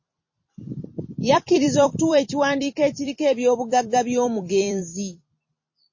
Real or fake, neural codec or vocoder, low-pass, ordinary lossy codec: fake; vocoder, 44.1 kHz, 128 mel bands every 256 samples, BigVGAN v2; 7.2 kHz; MP3, 32 kbps